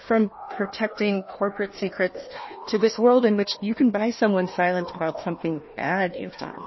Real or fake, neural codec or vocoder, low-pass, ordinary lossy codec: fake; codec, 16 kHz, 1 kbps, FunCodec, trained on Chinese and English, 50 frames a second; 7.2 kHz; MP3, 24 kbps